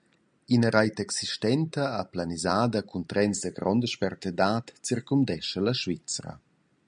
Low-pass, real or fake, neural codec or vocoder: 9.9 kHz; real; none